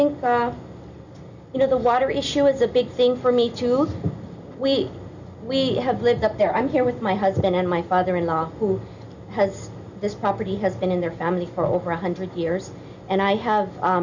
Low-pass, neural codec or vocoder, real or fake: 7.2 kHz; none; real